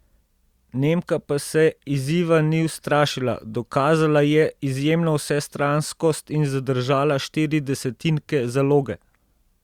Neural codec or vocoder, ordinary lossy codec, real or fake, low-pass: none; Opus, 64 kbps; real; 19.8 kHz